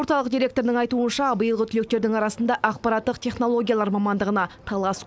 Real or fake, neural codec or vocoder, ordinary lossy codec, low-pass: real; none; none; none